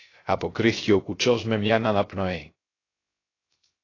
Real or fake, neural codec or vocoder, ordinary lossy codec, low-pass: fake; codec, 16 kHz, 0.3 kbps, FocalCodec; AAC, 32 kbps; 7.2 kHz